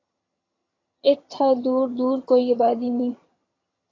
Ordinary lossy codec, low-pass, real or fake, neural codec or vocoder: AAC, 32 kbps; 7.2 kHz; fake; vocoder, 22.05 kHz, 80 mel bands, HiFi-GAN